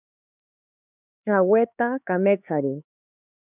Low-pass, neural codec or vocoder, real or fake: 3.6 kHz; codec, 16 kHz, 4 kbps, X-Codec, HuBERT features, trained on LibriSpeech; fake